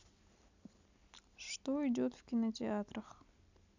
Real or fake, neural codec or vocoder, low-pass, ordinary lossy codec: real; none; 7.2 kHz; none